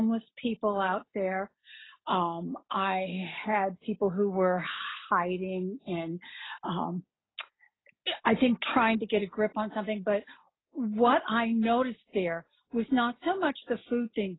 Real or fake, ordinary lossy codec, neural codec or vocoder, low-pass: real; AAC, 16 kbps; none; 7.2 kHz